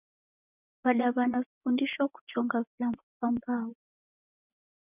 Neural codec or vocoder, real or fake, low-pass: vocoder, 24 kHz, 100 mel bands, Vocos; fake; 3.6 kHz